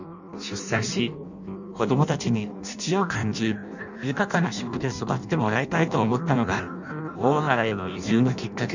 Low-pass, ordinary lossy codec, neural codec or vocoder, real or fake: 7.2 kHz; none; codec, 16 kHz in and 24 kHz out, 0.6 kbps, FireRedTTS-2 codec; fake